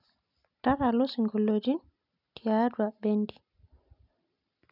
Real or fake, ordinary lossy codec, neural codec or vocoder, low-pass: real; none; none; 5.4 kHz